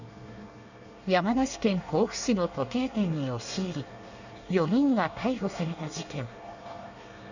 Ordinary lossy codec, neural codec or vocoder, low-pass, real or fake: none; codec, 24 kHz, 1 kbps, SNAC; 7.2 kHz; fake